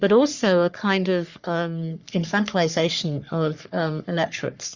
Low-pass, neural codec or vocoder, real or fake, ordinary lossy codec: 7.2 kHz; codec, 44.1 kHz, 3.4 kbps, Pupu-Codec; fake; Opus, 64 kbps